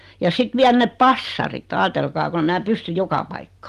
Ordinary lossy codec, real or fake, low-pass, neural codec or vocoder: Opus, 24 kbps; real; 14.4 kHz; none